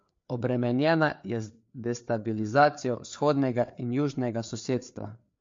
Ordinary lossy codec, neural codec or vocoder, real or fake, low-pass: MP3, 48 kbps; codec, 16 kHz, 4 kbps, FreqCodec, larger model; fake; 7.2 kHz